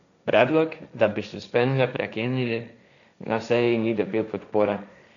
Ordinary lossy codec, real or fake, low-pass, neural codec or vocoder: none; fake; 7.2 kHz; codec, 16 kHz, 1.1 kbps, Voila-Tokenizer